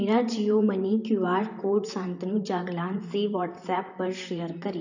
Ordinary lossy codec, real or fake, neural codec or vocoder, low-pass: none; fake; vocoder, 44.1 kHz, 128 mel bands, Pupu-Vocoder; 7.2 kHz